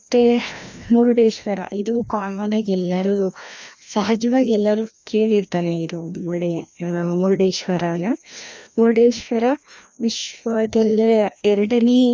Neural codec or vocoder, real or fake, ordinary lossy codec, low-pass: codec, 16 kHz, 1 kbps, FreqCodec, larger model; fake; none; none